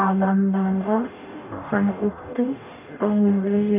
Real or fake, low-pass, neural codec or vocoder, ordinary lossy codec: fake; 3.6 kHz; codec, 44.1 kHz, 0.9 kbps, DAC; none